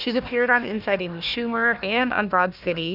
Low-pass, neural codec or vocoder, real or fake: 5.4 kHz; codec, 16 kHz, 1 kbps, FunCodec, trained on Chinese and English, 50 frames a second; fake